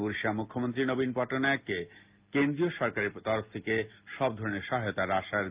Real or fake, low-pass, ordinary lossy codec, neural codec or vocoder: real; 3.6 kHz; Opus, 24 kbps; none